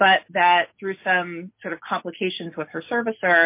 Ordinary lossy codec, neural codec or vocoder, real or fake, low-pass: MP3, 24 kbps; none; real; 3.6 kHz